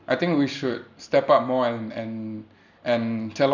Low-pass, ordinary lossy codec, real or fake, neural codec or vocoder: 7.2 kHz; none; real; none